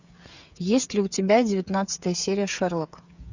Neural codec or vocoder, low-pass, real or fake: codec, 16 kHz, 8 kbps, FreqCodec, smaller model; 7.2 kHz; fake